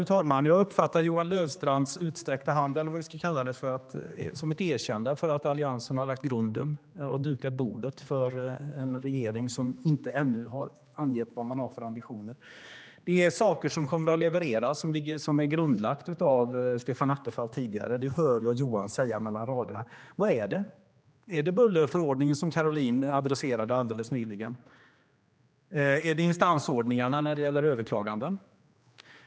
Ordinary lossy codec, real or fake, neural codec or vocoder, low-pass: none; fake; codec, 16 kHz, 2 kbps, X-Codec, HuBERT features, trained on general audio; none